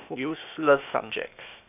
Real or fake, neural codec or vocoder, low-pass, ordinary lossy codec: fake; codec, 16 kHz, 0.8 kbps, ZipCodec; 3.6 kHz; none